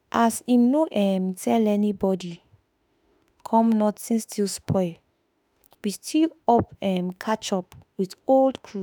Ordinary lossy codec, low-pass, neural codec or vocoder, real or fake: none; none; autoencoder, 48 kHz, 32 numbers a frame, DAC-VAE, trained on Japanese speech; fake